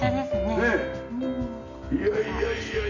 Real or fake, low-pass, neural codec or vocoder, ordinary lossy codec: real; 7.2 kHz; none; none